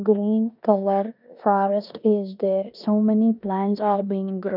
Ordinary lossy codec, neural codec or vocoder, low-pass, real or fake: none; codec, 16 kHz in and 24 kHz out, 0.9 kbps, LongCat-Audio-Codec, four codebook decoder; 5.4 kHz; fake